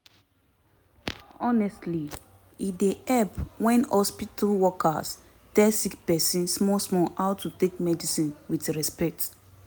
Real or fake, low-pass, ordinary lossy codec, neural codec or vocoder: real; none; none; none